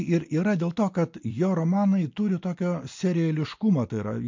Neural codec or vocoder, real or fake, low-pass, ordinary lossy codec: none; real; 7.2 kHz; MP3, 48 kbps